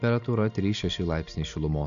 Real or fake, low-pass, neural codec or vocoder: real; 7.2 kHz; none